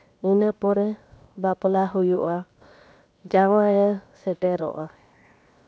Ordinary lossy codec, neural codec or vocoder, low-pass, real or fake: none; codec, 16 kHz, 0.7 kbps, FocalCodec; none; fake